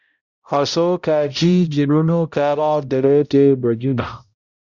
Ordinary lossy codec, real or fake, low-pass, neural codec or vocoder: Opus, 64 kbps; fake; 7.2 kHz; codec, 16 kHz, 0.5 kbps, X-Codec, HuBERT features, trained on balanced general audio